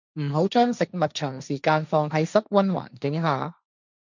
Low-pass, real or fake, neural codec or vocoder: 7.2 kHz; fake; codec, 16 kHz, 1.1 kbps, Voila-Tokenizer